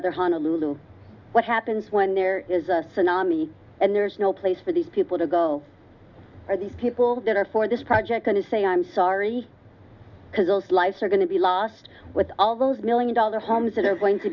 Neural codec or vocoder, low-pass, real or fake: none; 7.2 kHz; real